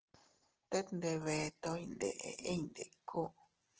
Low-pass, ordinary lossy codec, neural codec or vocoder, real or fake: 7.2 kHz; Opus, 16 kbps; none; real